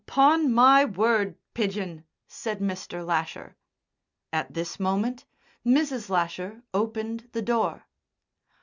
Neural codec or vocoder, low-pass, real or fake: none; 7.2 kHz; real